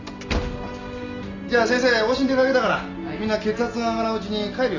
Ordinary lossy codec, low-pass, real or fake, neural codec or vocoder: none; 7.2 kHz; real; none